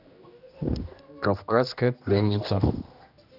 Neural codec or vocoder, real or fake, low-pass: codec, 16 kHz, 1 kbps, X-Codec, HuBERT features, trained on general audio; fake; 5.4 kHz